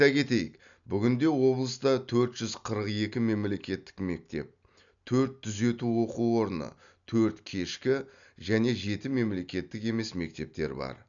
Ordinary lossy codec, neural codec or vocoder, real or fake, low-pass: none; none; real; 7.2 kHz